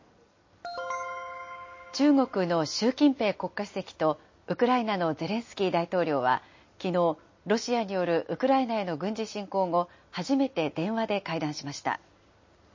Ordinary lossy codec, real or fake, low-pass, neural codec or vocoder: MP3, 32 kbps; real; 7.2 kHz; none